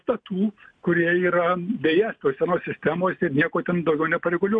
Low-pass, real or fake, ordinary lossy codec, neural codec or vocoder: 9.9 kHz; real; MP3, 96 kbps; none